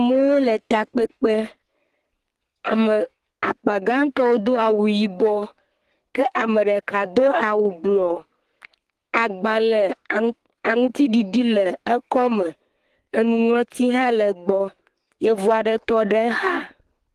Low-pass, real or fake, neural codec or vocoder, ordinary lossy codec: 14.4 kHz; fake; codec, 32 kHz, 1.9 kbps, SNAC; Opus, 24 kbps